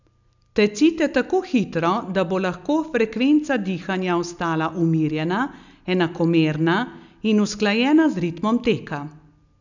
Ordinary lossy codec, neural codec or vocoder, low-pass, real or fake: none; none; 7.2 kHz; real